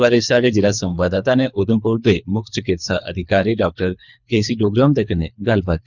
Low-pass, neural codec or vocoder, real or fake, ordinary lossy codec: 7.2 kHz; codec, 24 kHz, 3 kbps, HILCodec; fake; none